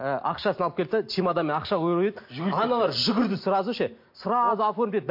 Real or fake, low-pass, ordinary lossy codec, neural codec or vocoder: real; 5.4 kHz; MP3, 32 kbps; none